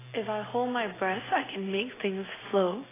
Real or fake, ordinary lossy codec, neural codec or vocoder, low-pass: real; AAC, 16 kbps; none; 3.6 kHz